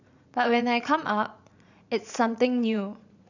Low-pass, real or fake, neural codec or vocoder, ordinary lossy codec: 7.2 kHz; fake; vocoder, 44.1 kHz, 80 mel bands, Vocos; none